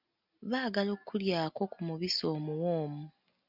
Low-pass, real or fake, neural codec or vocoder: 5.4 kHz; real; none